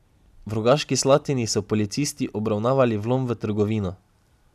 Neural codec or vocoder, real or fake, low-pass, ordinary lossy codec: none; real; 14.4 kHz; none